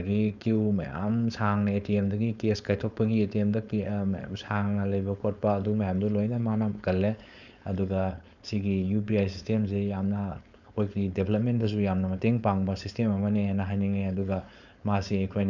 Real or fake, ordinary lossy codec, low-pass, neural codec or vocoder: fake; none; 7.2 kHz; codec, 16 kHz, 4.8 kbps, FACodec